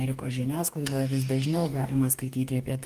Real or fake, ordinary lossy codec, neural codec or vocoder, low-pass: fake; Opus, 32 kbps; codec, 44.1 kHz, 2.6 kbps, DAC; 14.4 kHz